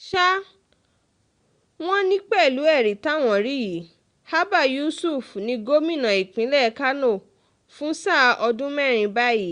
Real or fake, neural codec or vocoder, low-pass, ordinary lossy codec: real; none; 9.9 kHz; Opus, 64 kbps